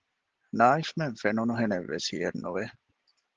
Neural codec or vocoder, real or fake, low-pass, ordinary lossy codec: none; real; 7.2 kHz; Opus, 16 kbps